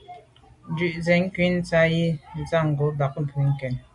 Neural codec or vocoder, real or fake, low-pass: none; real; 10.8 kHz